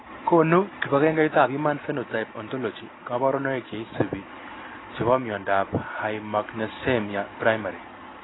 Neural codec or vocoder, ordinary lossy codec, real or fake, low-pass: none; AAC, 16 kbps; real; 7.2 kHz